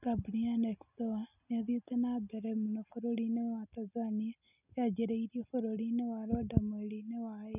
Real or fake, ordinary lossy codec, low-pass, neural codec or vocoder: real; none; 3.6 kHz; none